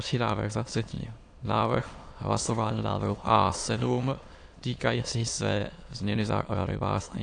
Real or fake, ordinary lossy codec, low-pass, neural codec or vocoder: fake; AAC, 48 kbps; 9.9 kHz; autoencoder, 22.05 kHz, a latent of 192 numbers a frame, VITS, trained on many speakers